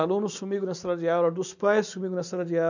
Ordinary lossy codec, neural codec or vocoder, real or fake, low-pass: none; none; real; 7.2 kHz